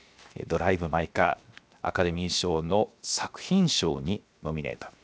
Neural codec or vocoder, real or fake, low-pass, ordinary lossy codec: codec, 16 kHz, 0.7 kbps, FocalCodec; fake; none; none